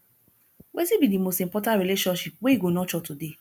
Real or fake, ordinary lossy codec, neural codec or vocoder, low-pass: real; none; none; none